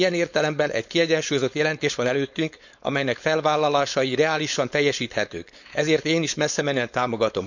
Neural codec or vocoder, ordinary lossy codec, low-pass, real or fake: codec, 16 kHz, 4.8 kbps, FACodec; none; 7.2 kHz; fake